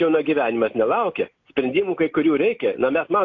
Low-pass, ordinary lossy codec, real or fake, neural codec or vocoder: 7.2 kHz; AAC, 48 kbps; real; none